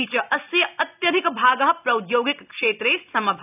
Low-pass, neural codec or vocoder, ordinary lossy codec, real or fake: 3.6 kHz; none; none; real